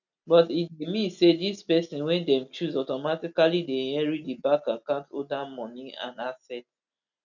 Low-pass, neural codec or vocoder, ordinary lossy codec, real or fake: 7.2 kHz; none; none; real